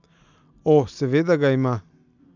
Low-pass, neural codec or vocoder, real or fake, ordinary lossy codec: 7.2 kHz; none; real; none